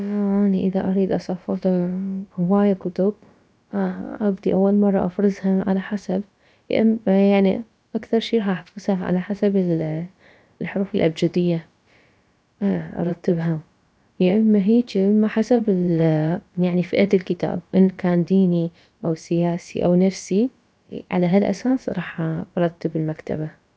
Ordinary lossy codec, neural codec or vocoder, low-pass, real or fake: none; codec, 16 kHz, about 1 kbps, DyCAST, with the encoder's durations; none; fake